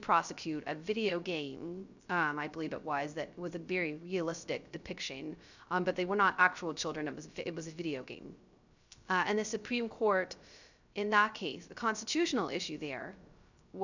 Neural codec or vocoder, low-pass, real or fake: codec, 16 kHz, 0.3 kbps, FocalCodec; 7.2 kHz; fake